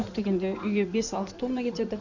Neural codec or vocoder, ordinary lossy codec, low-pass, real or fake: codec, 16 kHz in and 24 kHz out, 2.2 kbps, FireRedTTS-2 codec; none; 7.2 kHz; fake